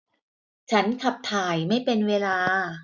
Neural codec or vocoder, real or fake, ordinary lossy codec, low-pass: none; real; none; 7.2 kHz